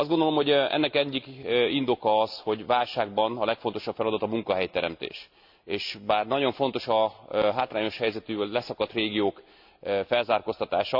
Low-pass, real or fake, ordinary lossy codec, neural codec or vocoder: 5.4 kHz; real; AAC, 48 kbps; none